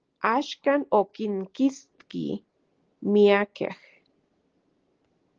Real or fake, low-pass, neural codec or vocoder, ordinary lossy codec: real; 7.2 kHz; none; Opus, 16 kbps